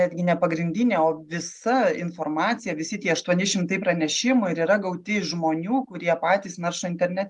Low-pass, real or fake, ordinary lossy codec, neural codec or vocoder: 10.8 kHz; real; Opus, 32 kbps; none